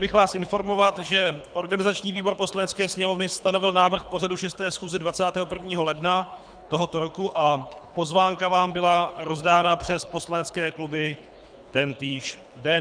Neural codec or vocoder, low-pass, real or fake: codec, 24 kHz, 3 kbps, HILCodec; 9.9 kHz; fake